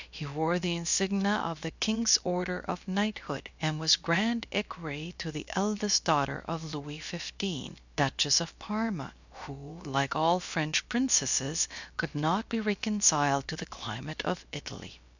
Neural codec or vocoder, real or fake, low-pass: codec, 16 kHz, about 1 kbps, DyCAST, with the encoder's durations; fake; 7.2 kHz